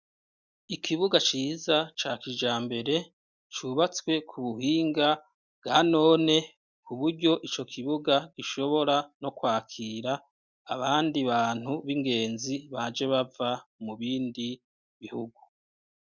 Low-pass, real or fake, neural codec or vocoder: 7.2 kHz; real; none